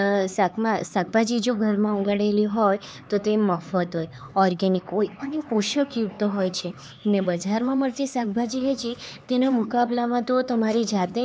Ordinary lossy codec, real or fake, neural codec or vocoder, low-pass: none; fake; codec, 16 kHz, 4 kbps, X-Codec, HuBERT features, trained on LibriSpeech; none